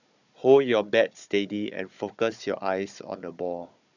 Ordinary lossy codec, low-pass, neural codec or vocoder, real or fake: none; 7.2 kHz; codec, 16 kHz, 16 kbps, FunCodec, trained on Chinese and English, 50 frames a second; fake